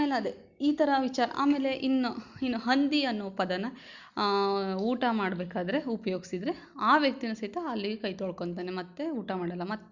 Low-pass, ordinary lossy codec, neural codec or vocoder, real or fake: 7.2 kHz; Opus, 64 kbps; none; real